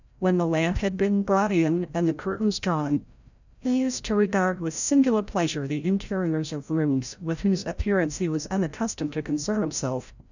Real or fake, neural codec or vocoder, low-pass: fake; codec, 16 kHz, 0.5 kbps, FreqCodec, larger model; 7.2 kHz